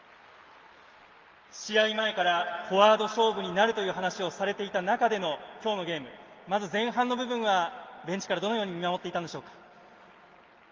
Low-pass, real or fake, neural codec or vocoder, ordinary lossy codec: 7.2 kHz; real; none; Opus, 24 kbps